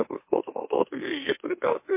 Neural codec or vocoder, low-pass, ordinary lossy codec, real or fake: autoencoder, 44.1 kHz, a latent of 192 numbers a frame, MeloTTS; 3.6 kHz; MP3, 24 kbps; fake